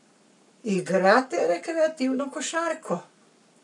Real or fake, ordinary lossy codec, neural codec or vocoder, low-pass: fake; none; codec, 44.1 kHz, 7.8 kbps, Pupu-Codec; 10.8 kHz